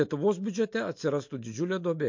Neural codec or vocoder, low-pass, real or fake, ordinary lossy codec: none; 7.2 kHz; real; MP3, 48 kbps